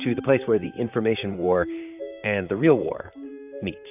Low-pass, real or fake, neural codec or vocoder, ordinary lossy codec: 3.6 kHz; fake; vocoder, 44.1 kHz, 128 mel bands, Pupu-Vocoder; AAC, 32 kbps